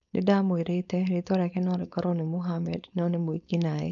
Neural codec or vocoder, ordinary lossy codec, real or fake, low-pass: codec, 16 kHz, 4.8 kbps, FACodec; none; fake; 7.2 kHz